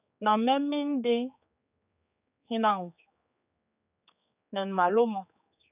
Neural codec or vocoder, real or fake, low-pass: codec, 16 kHz, 4 kbps, X-Codec, HuBERT features, trained on general audio; fake; 3.6 kHz